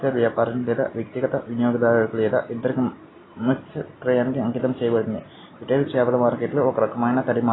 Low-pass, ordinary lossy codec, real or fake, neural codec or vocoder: 7.2 kHz; AAC, 16 kbps; real; none